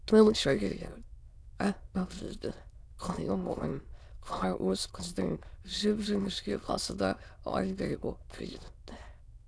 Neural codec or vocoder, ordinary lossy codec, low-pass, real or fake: autoencoder, 22.05 kHz, a latent of 192 numbers a frame, VITS, trained on many speakers; none; none; fake